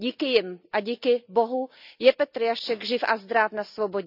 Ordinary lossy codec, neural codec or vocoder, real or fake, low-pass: none; none; real; 5.4 kHz